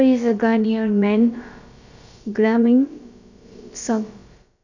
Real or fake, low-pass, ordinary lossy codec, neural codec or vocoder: fake; 7.2 kHz; none; codec, 16 kHz, about 1 kbps, DyCAST, with the encoder's durations